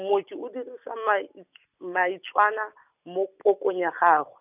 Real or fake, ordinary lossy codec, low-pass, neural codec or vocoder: real; none; 3.6 kHz; none